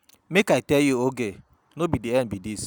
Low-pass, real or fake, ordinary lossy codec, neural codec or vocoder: none; real; none; none